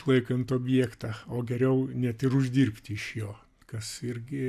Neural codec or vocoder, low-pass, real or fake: none; 14.4 kHz; real